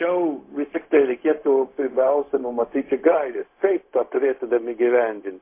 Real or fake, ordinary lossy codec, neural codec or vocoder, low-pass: fake; MP3, 24 kbps; codec, 16 kHz, 0.4 kbps, LongCat-Audio-Codec; 3.6 kHz